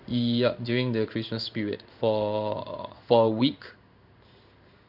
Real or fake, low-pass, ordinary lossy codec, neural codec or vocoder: fake; 5.4 kHz; none; codec, 16 kHz in and 24 kHz out, 1 kbps, XY-Tokenizer